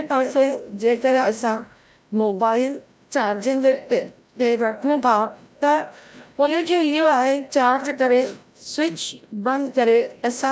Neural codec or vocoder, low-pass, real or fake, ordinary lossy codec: codec, 16 kHz, 0.5 kbps, FreqCodec, larger model; none; fake; none